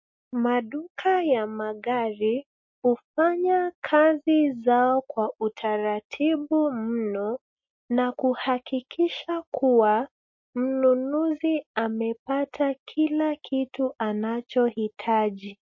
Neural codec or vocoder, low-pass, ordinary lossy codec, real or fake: none; 7.2 kHz; MP3, 32 kbps; real